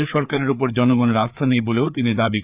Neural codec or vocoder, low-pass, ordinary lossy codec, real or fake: codec, 16 kHz, 4 kbps, FreqCodec, larger model; 3.6 kHz; Opus, 64 kbps; fake